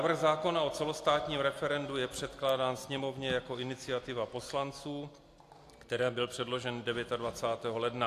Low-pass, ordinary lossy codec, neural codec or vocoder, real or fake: 14.4 kHz; AAC, 64 kbps; none; real